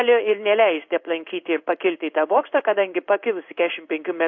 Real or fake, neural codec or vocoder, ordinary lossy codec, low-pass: fake; codec, 16 kHz in and 24 kHz out, 1 kbps, XY-Tokenizer; AAC, 48 kbps; 7.2 kHz